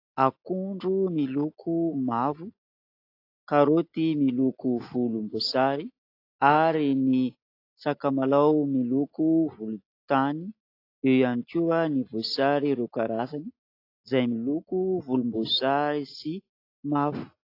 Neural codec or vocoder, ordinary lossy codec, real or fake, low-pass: none; AAC, 32 kbps; real; 5.4 kHz